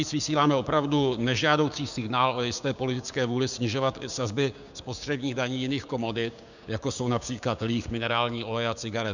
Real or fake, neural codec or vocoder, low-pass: fake; codec, 16 kHz, 6 kbps, DAC; 7.2 kHz